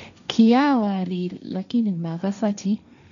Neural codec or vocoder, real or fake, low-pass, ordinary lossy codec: codec, 16 kHz, 1.1 kbps, Voila-Tokenizer; fake; 7.2 kHz; none